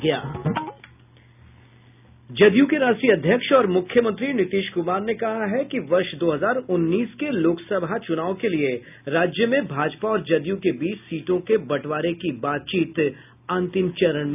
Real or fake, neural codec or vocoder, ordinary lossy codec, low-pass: real; none; none; 3.6 kHz